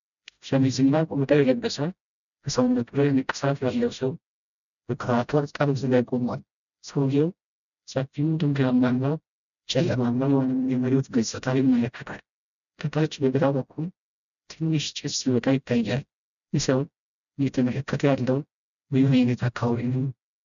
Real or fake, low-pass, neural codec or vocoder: fake; 7.2 kHz; codec, 16 kHz, 0.5 kbps, FreqCodec, smaller model